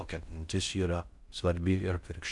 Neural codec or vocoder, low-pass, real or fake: codec, 16 kHz in and 24 kHz out, 0.6 kbps, FocalCodec, streaming, 2048 codes; 10.8 kHz; fake